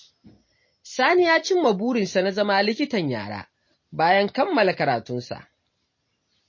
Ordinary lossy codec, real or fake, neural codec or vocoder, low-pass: MP3, 32 kbps; real; none; 7.2 kHz